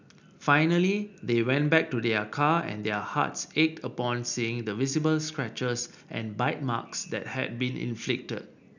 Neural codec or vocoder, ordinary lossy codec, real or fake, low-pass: none; none; real; 7.2 kHz